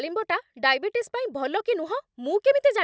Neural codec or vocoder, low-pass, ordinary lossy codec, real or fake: none; none; none; real